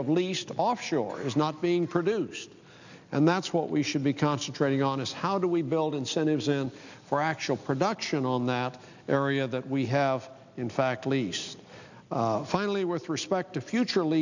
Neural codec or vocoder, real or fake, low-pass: none; real; 7.2 kHz